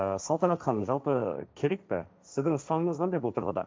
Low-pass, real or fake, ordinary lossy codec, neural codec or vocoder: none; fake; none; codec, 16 kHz, 1.1 kbps, Voila-Tokenizer